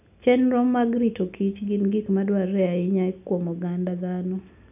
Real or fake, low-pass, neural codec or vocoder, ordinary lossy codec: real; 3.6 kHz; none; none